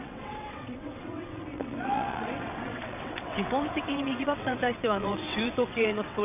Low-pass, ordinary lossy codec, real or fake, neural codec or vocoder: 3.6 kHz; none; fake; vocoder, 22.05 kHz, 80 mel bands, WaveNeXt